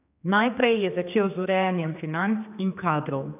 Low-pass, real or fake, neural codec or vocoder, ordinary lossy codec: 3.6 kHz; fake; codec, 16 kHz, 2 kbps, X-Codec, HuBERT features, trained on general audio; none